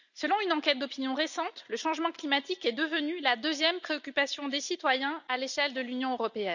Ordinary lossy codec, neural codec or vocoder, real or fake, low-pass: none; none; real; 7.2 kHz